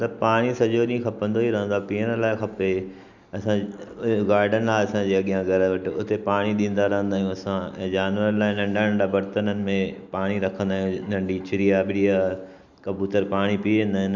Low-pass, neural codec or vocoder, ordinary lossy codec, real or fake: 7.2 kHz; none; none; real